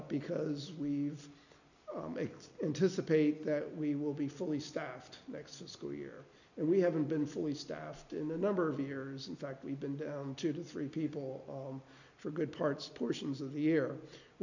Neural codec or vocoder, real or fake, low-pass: none; real; 7.2 kHz